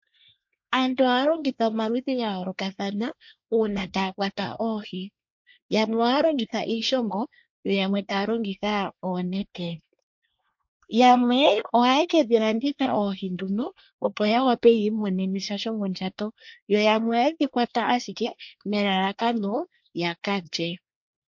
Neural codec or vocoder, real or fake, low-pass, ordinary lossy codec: codec, 24 kHz, 1 kbps, SNAC; fake; 7.2 kHz; MP3, 48 kbps